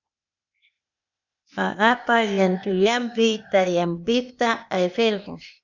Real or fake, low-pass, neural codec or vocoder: fake; 7.2 kHz; codec, 16 kHz, 0.8 kbps, ZipCodec